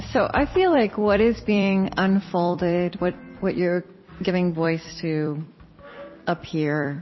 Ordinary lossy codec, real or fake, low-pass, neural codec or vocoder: MP3, 24 kbps; fake; 7.2 kHz; vocoder, 44.1 kHz, 128 mel bands every 256 samples, BigVGAN v2